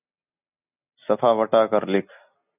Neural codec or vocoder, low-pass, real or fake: none; 3.6 kHz; real